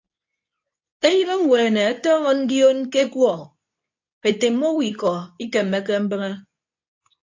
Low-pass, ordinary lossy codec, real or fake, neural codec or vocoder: 7.2 kHz; AAC, 48 kbps; fake; codec, 24 kHz, 0.9 kbps, WavTokenizer, medium speech release version 2